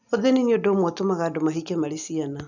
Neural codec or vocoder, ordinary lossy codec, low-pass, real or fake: none; none; 7.2 kHz; real